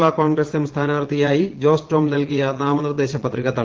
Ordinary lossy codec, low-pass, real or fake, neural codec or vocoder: Opus, 16 kbps; 7.2 kHz; fake; vocoder, 22.05 kHz, 80 mel bands, Vocos